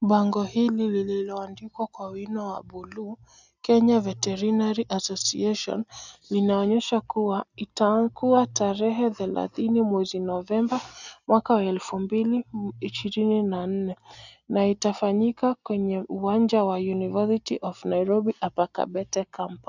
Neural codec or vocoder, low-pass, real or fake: none; 7.2 kHz; real